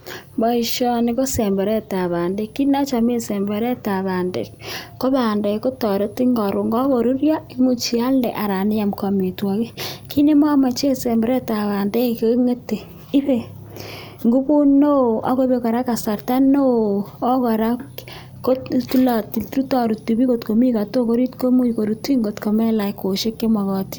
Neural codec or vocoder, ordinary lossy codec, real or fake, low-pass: none; none; real; none